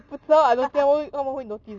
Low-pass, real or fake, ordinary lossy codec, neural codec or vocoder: 7.2 kHz; real; none; none